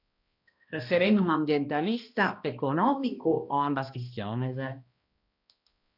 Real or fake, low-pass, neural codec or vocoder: fake; 5.4 kHz; codec, 16 kHz, 1 kbps, X-Codec, HuBERT features, trained on balanced general audio